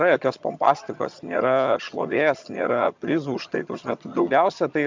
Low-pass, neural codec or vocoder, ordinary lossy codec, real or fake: 7.2 kHz; vocoder, 22.05 kHz, 80 mel bands, HiFi-GAN; MP3, 64 kbps; fake